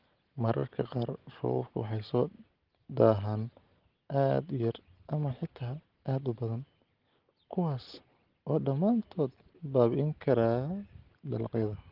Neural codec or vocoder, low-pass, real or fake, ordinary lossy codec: none; 5.4 kHz; real; Opus, 16 kbps